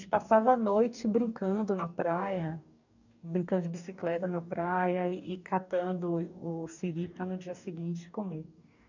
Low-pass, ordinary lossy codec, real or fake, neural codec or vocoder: 7.2 kHz; none; fake; codec, 44.1 kHz, 2.6 kbps, DAC